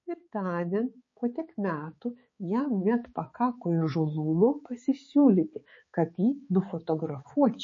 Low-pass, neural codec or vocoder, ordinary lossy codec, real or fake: 7.2 kHz; codec, 16 kHz, 4 kbps, X-Codec, HuBERT features, trained on balanced general audio; MP3, 32 kbps; fake